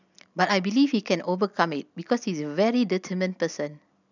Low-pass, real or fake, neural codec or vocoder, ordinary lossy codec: 7.2 kHz; real; none; none